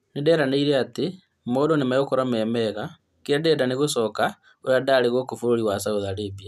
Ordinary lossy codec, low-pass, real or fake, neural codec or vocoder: none; 14.4 kHz; real; none